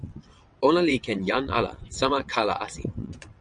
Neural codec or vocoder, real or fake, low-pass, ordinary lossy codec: vocoder, 22.05 kHz, 80 mel bands, WaveNeXt; fake; 9.9 kHz; MP3, 96 kbps